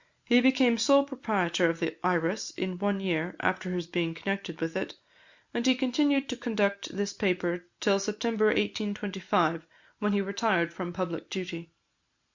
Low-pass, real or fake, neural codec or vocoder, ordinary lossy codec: 7.2 kHz; real; none; Opus, 64 kbps